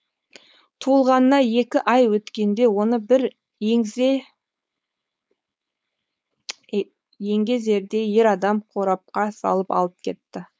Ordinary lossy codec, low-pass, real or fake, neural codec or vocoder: none; none; fake; codec, 16 kHz, 4.8 kbps, FACodec